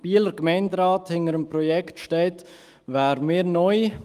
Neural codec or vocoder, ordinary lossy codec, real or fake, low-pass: autoencoder, 48 kHz, 128 numbers a frame, DAC-VAE, trained on Japanese speech; Opus, 32 kbps; fake; 14.4 kHz